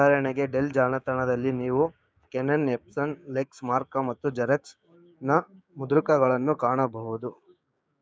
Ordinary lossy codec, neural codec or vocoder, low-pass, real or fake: none; codec, 16 kHz, 6 kbps, DAC; none; fake